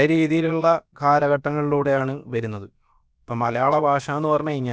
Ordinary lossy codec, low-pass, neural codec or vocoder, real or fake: none; none; codec, 16 kHz, about 1 kbps, DyCAST, with the encoder's durations; fake